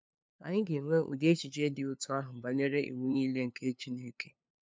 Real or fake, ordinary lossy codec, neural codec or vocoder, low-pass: fake; none; codec, 16 kHz, 2 kbps, FunCodec, trained on LibriTTS, 25 frames a second; none